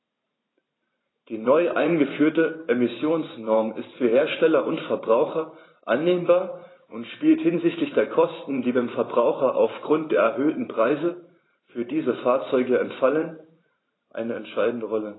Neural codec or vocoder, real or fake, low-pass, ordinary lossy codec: vocoder, 44.1 kHz, 128 mel bands every 512 samples, BigVGAN v2; fake; 7.2 kHz; AAC, 16 kbps